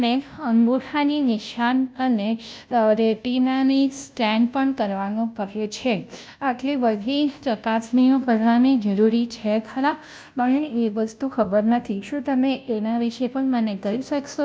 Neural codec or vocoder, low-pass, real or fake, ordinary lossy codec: codec, 16 kHz, 0.5 kbps, FunCodec, trained on Chinese and English, 25 frames a second; none; fake; none